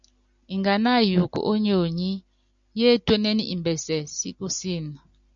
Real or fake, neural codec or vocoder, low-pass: real; none; 7.2 kHz